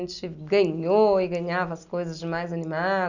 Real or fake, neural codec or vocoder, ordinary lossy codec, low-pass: real; none; none; 7.2 kHz